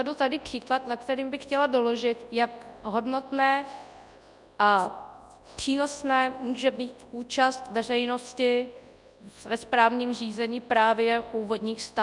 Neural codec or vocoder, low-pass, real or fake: codec, 24 kHz, 0.9 kbps, WavTokenizer, large speech release; 10.8 kHz; fake